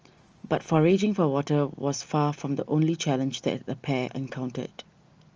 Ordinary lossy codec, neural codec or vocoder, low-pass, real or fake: Opus, 24 kbps; none; 7.2 kHz; real